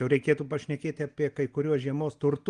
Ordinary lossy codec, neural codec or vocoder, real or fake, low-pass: Opus, 24 kbps; none; real; 9.9 kHz